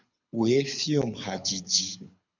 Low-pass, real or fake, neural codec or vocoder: 7.2 kHz; fake; codec, 24 kHz, 6 kbps, HILCodec